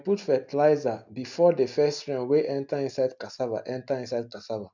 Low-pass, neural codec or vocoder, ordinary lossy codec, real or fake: 7.2 kHz; none; none; real